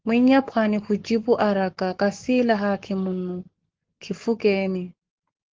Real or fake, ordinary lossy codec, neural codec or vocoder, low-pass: fake; Opus, 16 kbps; codec, 44.1 kHz, 7.8 kbps, Pupu-Codec; 7.2 kHz